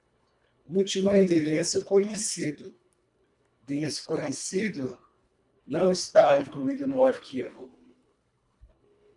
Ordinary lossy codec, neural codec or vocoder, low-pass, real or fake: MP3, 96 kbps; codec, 24 kHz, 1.5 kbps, HILCodec; 10.8 kHz; fake